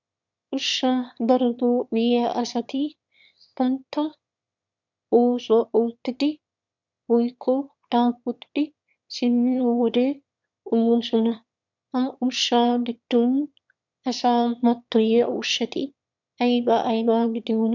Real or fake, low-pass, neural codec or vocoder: fake; 7.2 kHz; autoencoder, 22.05 kHz, a latent of 192 numbers a frame, VITS, trained on one speaker